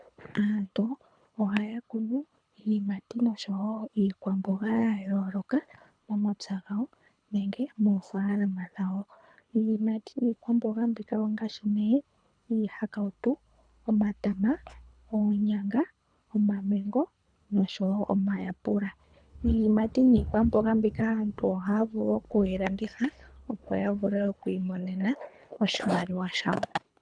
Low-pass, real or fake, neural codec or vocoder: 9.9 kHz; fake; codec, 24 kHz, 3 kbps, HILCodec